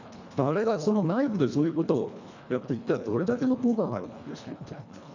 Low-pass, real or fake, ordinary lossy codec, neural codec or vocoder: 7.2 kHz; fake; none; codec, 24 kHz, 1.5 kbps, HILCodec